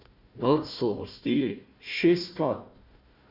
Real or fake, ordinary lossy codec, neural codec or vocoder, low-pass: fake; none; codec, 16 kHz, 1 kbps, FunCodec, trained on Chinese and English, 50 frames a second; 5.4 kHz